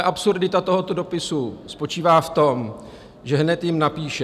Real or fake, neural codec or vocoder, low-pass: real; none; 14.4 kHz